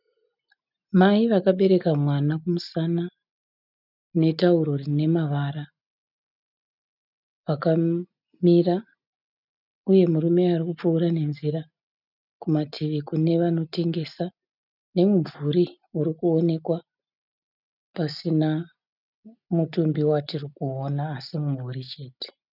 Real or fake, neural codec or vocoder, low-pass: real; none; 5.4 kHz